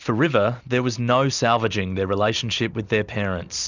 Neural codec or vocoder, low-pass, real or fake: none; 7.2 kHz; real